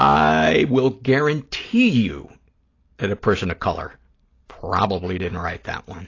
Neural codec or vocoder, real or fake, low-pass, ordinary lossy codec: none; real; 7.2 kHz; AAC, 32 kbps